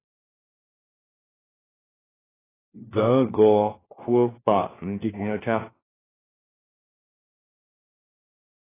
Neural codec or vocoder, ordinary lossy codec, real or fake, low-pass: codec, 16 kHz, 1 kbps, FunCodec, trained on LibriTTS, 50 frames a second; AAC, 16 kbps; fake; 3.6 kHz